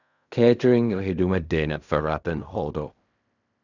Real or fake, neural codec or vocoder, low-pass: fake; codec, 16 kHz in and 24 kHz out, 0.4 kbps, LongCat-Audio-Codec, fine tuned four codebook decoder; 7.2 kHz